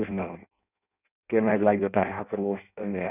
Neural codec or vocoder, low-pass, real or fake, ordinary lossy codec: codec, 16 kHz in and 24 kHz out, 0.6 kbps, FireRedTTS-2 codec; 3.6 kHz; fake; none